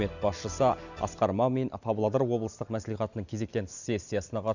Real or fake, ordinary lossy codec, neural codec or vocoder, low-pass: real; none; none; 7.2 kHz